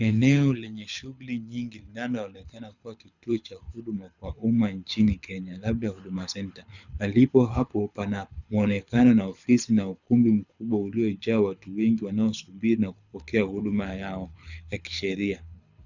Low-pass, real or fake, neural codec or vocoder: 7.2 kHz; fake; codec, 24 kHz, 6 kbps, HILCodec